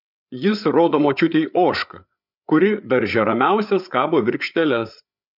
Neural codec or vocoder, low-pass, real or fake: codec, 16 kHz, 8 kbps, FreqCodec, larger model; 5.4 kHz; fake